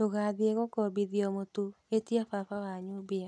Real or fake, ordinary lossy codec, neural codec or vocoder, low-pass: real; none; none; none